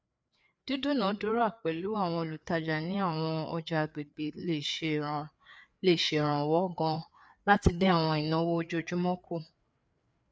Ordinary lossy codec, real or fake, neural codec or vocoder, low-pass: none; fake; codec, 16 kHz, 4 kbps, FreqCodec, larger model; none